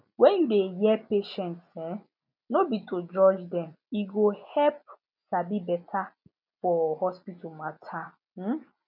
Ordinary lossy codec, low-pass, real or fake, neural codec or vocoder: none; 5.4 kHz; real; none